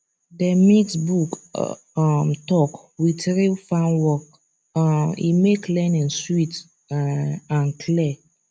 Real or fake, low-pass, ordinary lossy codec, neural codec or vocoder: real; none; none; none